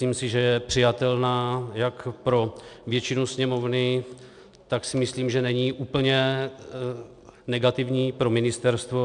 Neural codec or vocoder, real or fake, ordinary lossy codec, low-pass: none; real; MP3, 96 kbps; 9.9 kHz